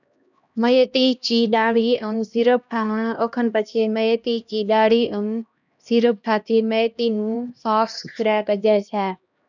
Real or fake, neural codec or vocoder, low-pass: fake; codec, 16 kHz, 1 kbps, X-Codec, HuBERT features, trained on LibriSpeech; 7.2 kHz